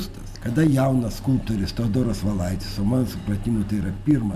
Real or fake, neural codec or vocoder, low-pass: real; none; 14.4 kHz